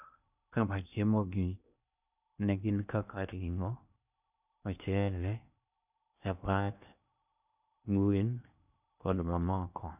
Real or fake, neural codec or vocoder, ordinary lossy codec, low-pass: fake; codec, 16 kHz in and 24 kHz out, 0.6 kbps, FocalCodec, streaming, 2048 codes; none; 3.6 kHz